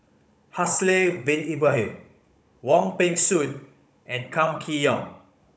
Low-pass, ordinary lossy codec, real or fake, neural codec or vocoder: none; none; fake; codec, 16 kHz, 16 kbps, FunCodec, trained on Chinese and English, 50 frames a second